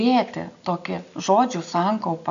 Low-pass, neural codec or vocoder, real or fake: 7.2 kHz; none; real